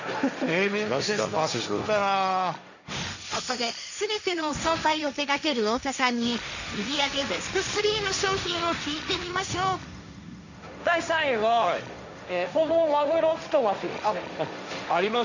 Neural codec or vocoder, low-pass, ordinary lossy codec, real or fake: codec, 16 kHz, 1.1 kbps, Voila-Tokenizer; 7.2 kHz; none; fake